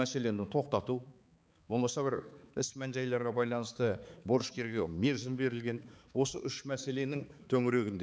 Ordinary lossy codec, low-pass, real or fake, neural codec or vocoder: none; none; fake; codec, 16 kHz, 4 kbps, X-Codec, HuBERT features, trained on balanced general audio